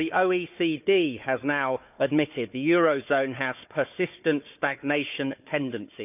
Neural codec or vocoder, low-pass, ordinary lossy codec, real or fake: codec, 16 kHz, 4 kbps, FunCodec, trained on Chinese and English, 50 frames a second; 3.6 kHz; none; fake